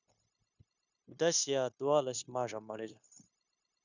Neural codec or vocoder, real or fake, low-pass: codec, 16 kHz, 0.9 kbps, LongCat-Audio-Codec; fake; 7.2 kHz